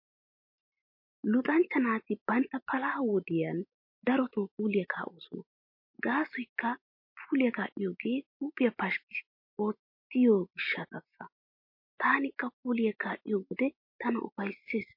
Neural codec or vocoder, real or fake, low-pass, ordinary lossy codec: none; real; 5.4 kHz; MP3, 32 kbps